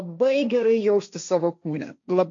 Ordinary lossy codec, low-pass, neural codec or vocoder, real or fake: MP3, 48 kbps; 7.2 kHz; codec, 16 kHz, 1.1 kbps, Voila-Tokenizer; fake